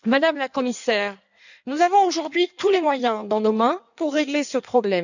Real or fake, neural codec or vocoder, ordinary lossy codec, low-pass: fake; codec, 16 kHz in and 24 kHz out, 1.1 kbps, FireRedTTS-2 codec; none; 7.2 kHz